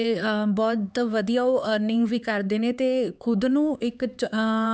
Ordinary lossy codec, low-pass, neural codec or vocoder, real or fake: none; none; codec, 16 kHz, 4 kbps, X-Codec, HuBERT features, trained on LibriSpeech; fake